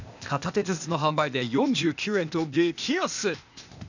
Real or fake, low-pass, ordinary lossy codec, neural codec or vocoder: fake; 7.2 kHz; none; codec, 16 kHz, 0.8 kbps, ZipCodec